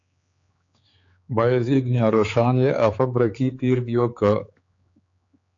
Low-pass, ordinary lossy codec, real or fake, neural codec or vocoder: 7.2 kHz; AAC, 48 kbps; fake; codec, 16 kHz, 4 kbps, X-Codec, HuBERT features, trained on general audio